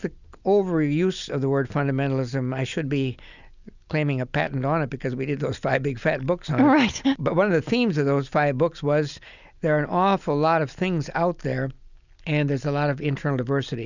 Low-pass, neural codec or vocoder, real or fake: 7.2 kHz; none; real